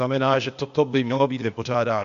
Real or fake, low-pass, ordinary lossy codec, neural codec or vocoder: fake; 7.2 kHz; MP3, 64 kbps; codec, 16 kHz, 0.8 kbps, ZipCodec